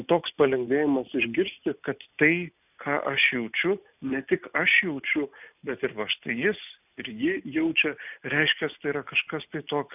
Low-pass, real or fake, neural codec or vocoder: 3.6 kHz; real; none